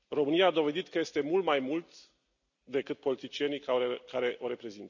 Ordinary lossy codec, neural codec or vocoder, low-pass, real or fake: none; none; 7.2 kHz; real